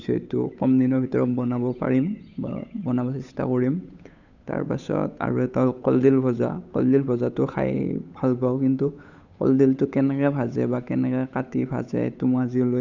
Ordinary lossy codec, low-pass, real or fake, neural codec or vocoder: none; 7.2 kHz; fake; codec, 16 kHz, 8 kbps, FunCodec, trained on Chinese and English, 25 frames a second